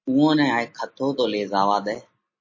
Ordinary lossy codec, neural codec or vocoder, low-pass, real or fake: MP3, 32 kbps; none; 7.2 kHz; real